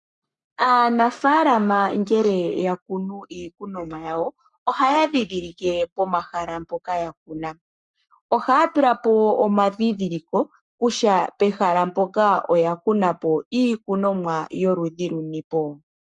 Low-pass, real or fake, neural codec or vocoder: 10.8 kHz; fake; codec, 44.1 kHz, 7.8 kbps, Pupu-Codec